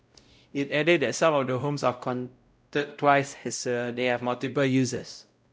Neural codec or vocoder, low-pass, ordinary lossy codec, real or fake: codec, 16 kHz, 0.5 kbps, X-Codec, WavLM features, trained on Multilingual LibriSpeech; none; none; fake